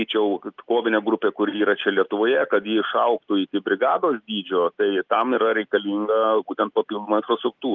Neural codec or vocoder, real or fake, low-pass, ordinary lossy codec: none; real; 7.2 kHz; Opus, 32 kbps